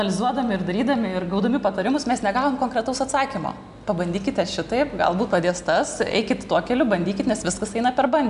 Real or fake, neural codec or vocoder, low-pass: real; none; 10.8 kHz